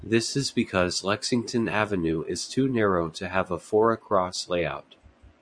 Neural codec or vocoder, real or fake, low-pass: vocoder, 24 kHz, 100 mel bands, Vocos; fake; 9.9 kHz